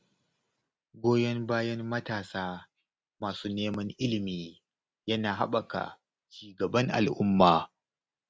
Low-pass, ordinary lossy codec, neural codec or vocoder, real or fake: none; none; none; real